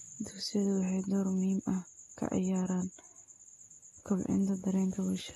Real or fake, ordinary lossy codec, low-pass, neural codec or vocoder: real; AAC, 32 kbps; 19.8 kHz; none